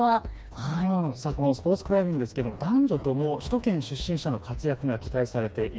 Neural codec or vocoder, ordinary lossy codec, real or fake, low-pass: codec, 16 kHz, 2 kbps, FreqCodec, smaller model; none; fake; none